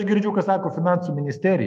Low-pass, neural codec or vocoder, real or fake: 14.4 kHz; autoencoder, 48 kHz, 128 numbers a frame, DAC-VAE, trained on Japanese speech; fake